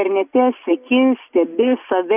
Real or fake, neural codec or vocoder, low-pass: real; none; 3.6 kHz